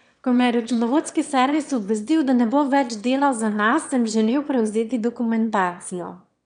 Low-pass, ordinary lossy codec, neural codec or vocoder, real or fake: 9.9 kHz; none; autoencoder, 22.05 kHz, a latent of 192 numbers a frame, VITS, trained on one speaker; fake